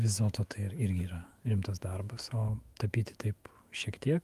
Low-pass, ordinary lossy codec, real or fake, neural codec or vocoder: 14.4 kHz; Opus, 32 kbps; fake; autoencoder, 48 kHz, 128 numbers a frame, DAC-VAE, trained on Japanese speech